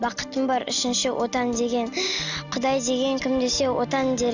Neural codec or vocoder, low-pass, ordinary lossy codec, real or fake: none; 7.2 kHz; none; real